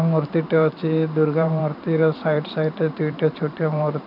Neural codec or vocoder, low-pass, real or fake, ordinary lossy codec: vocoder, 44.1 kHz, 128 mel bands every 512 samples, BigVGAN v2; 5.4 kHz; fake; none